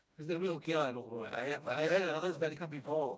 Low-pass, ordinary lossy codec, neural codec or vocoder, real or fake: none; none; codec, 16 kHz, 1 kbps, FreqCodec, smaller model; fake